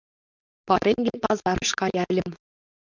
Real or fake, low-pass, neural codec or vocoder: fake; 7.2 kHz; codec, 16 kHz, 4.8 kbps, FACodec